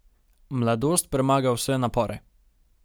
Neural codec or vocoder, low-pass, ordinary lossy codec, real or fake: none; none; none; real